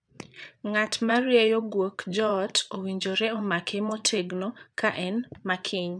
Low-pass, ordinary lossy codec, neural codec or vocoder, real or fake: 9.9 kHz; none; vocoder, 22.05 kHz, 80 mel bands, Vocos; fake